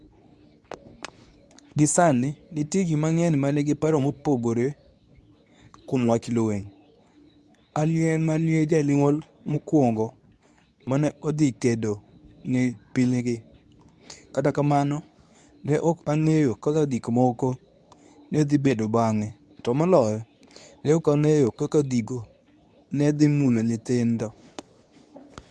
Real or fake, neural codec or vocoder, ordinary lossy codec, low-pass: fake; codec, 24 kHz, 0.9 kbps, WavTokenizer, medium speech release version 2; none; none